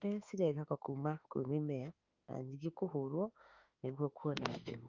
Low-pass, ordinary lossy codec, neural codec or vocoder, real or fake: 7.2 kHz; Opus, 32 kbps; autoencoder, 48 kHz, 32 numbers a frame, DAC-VAE, trained on Japanese speech; fake